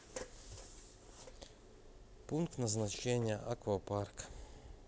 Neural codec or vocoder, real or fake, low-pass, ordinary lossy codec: none; real; none; none